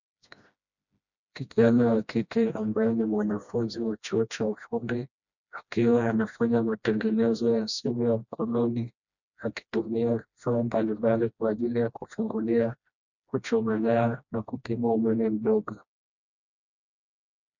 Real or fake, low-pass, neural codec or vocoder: fake; 7.2 kHz; codec, 16 kHz, 1 kbps, FreqCodec, smaller model